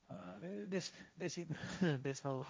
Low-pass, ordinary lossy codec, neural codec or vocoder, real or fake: none; none; codec, 16 kHz, 1.1 kbps, Voila-Tokenizer; fake